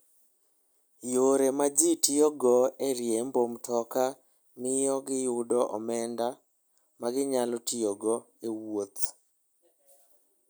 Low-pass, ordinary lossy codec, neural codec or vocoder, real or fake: none; none; none; real